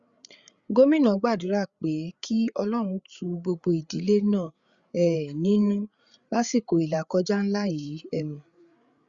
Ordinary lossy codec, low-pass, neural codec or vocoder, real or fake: Opus, 64 kbps; 7.2 kHz; codec, 16 kHz, 8 kbps, FreqCodec, larger model; fake